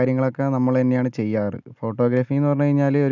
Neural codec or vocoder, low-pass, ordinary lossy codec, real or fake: none; 7.2 kHz; none; real